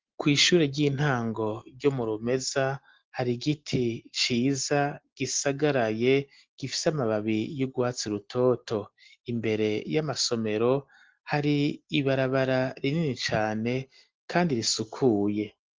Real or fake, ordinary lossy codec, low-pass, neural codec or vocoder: real; Opus, 16 kbps; 7.2 kHz; none